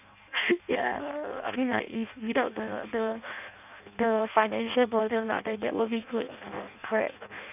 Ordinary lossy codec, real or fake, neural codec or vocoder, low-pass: none; fake; codec, 16 kHz in and 24 kHz out, 0.6 kbps, FireRedTTS-2 codec; 3.6 kHz